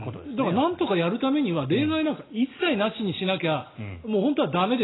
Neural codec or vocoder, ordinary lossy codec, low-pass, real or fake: none; AAC, 16 kbps; 7.2 kHz; real